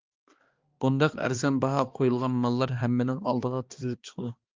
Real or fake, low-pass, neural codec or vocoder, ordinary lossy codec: fake; 7.2 kHz; codec, 16 kHz, 2 kbps, X-Codec, HuBERT features, trained on balanced general audio; Opus, 32 kbps